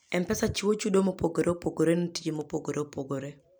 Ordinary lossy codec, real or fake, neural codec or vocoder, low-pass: none; real; none; none